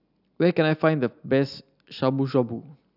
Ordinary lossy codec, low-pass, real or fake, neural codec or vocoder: none; 5.4 kHz; real; none